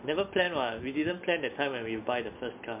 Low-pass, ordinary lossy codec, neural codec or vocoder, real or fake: 3.6 kHz; MP3, 32 kbps; none; real